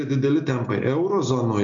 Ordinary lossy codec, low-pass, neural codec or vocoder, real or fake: MP3, 96 kbps; 7.2 kHz; none; real